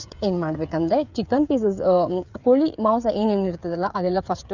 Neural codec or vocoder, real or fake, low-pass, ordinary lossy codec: codec, 16 kHz, 8 kbps, FreqCodec, smaller model; fake; 7.2 kHz; none